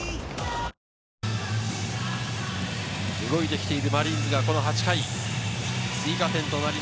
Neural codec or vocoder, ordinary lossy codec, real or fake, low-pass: none; none; real; none